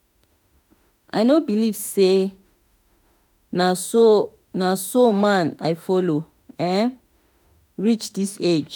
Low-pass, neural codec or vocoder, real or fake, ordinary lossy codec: none; autoencoder, 48 kHz, 32 numbers a frame, DAC-VAE, trained on Japanese speech; fake; none